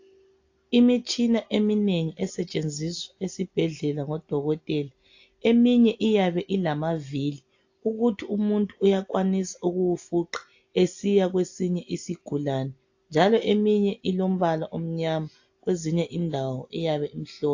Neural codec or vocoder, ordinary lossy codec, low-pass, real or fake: none; AAC, 48 kbps; 7.2 kHz; real